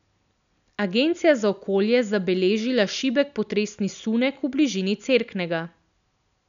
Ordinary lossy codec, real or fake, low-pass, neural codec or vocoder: none; real; 7.2 kHz; none